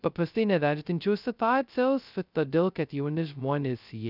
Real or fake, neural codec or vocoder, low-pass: fake; codec, 16 kHz, 0.2 kbps, FocalCodec; 5.4 kHz